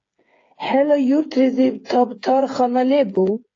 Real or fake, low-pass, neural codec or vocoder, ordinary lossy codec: fake; 7.2 kHz; codec, 16 kHz, 8 kbps, FreqCodec, smaller model; AAC, 32 kbps